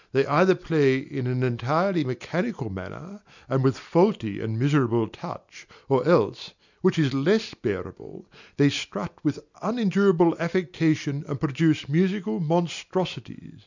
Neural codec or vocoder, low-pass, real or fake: none; 7.2 kHz; real